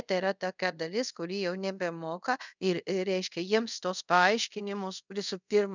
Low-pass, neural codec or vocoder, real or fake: 7.2 kHz; codec, 24 kHz, 0.5 kbps, DualCodec; fake